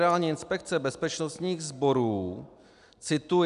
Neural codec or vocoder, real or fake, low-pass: none; real; 10.8 kHz